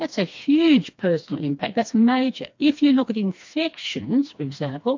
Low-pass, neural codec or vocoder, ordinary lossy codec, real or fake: 7.2 kHz; codec, 16 kHz, 2 kbps, FreqCodec, smaller model; MP3, 48 kbps; fake